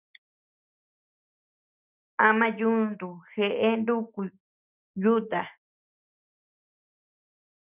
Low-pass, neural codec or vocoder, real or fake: 3.6 kHz; none; real